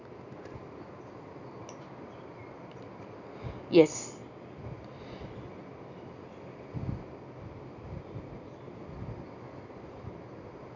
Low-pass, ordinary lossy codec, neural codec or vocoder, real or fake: 7.2 kHz; none; none; real